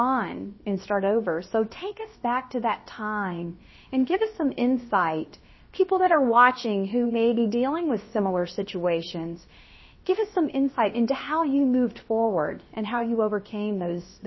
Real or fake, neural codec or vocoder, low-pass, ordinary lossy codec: fake; codec, 16 kHz, about 1 kbps, DyCAST, with the encoder's durations; 7.2 kHz; MP3, 24 kbps